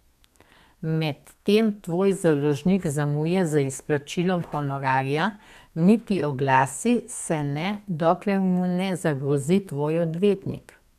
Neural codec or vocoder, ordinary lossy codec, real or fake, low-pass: codec, 32 kHz, 1.9 kbps, SNAC; none; fake; 14.4 kHz